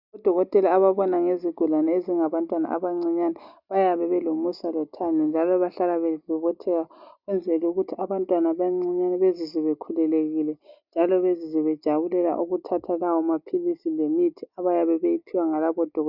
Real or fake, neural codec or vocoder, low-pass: real; none; 5.4 kHz